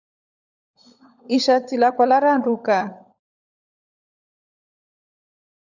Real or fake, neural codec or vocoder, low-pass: fake; codec, 16 kHz, 16 kbps, FunCodec, trained on LibriTTS, 50 frames a second; 7.2 kHz